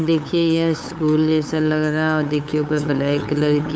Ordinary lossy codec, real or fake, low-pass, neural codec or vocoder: none; fake; none; codec, 16 kHz, 8 kbps, FunCodec, trained on LibriTTS, 25 frames a second